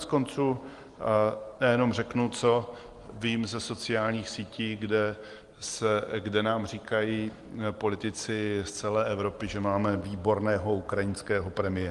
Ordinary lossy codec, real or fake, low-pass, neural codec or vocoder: Opus, 24 kbps; real; 10.8 kHz; none